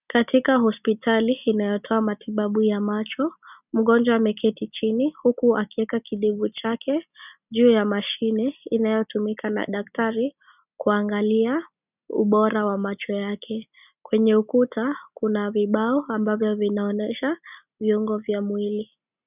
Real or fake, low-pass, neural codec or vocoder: real; 3.6 kHz; none